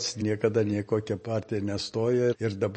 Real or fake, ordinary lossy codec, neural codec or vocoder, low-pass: real; MP3, 32 kbps; none; 9.9 kHz